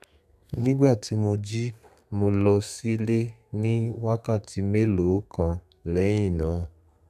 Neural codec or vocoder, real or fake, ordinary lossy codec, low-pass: codec, 32 kHz, 1.9 kbps, SNAC; fake; none; 14.4 kHz